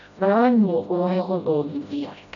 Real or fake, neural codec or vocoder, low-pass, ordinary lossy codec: fake; codec, 16 kHz, 0.5 kbps, FreqCodec, smaller model; 7.2 kHz; none